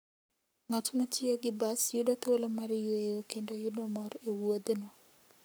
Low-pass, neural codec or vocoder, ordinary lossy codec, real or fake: none; codec, 44.1 kHz, 7.8 kbps, Pupu-Codec; none; fake